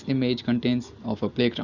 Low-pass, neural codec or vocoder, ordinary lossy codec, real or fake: 7.2 kHz; none; none; real